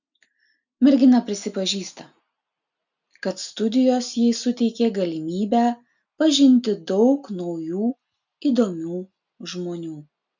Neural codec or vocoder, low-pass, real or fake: none; 7.2 kHz; real